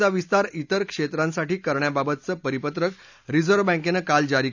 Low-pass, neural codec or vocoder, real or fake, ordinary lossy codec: 7.2 kHz; none; real; none